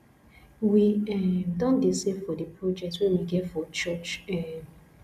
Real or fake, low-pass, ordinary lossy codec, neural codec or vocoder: real; 14.4 kHz; none; none